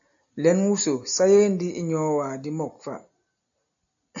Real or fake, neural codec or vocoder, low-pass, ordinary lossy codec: real; none; 7.2 kHz; AAC, 64 kbps